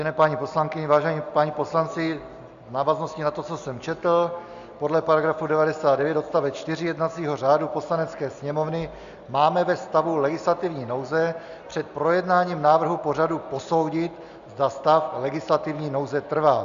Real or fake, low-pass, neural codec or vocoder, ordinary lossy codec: real; 7.2 kHz; none; Opus, 64 kbps